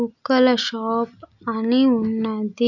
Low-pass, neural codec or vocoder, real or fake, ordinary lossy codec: 7.2 kHz; none; real; none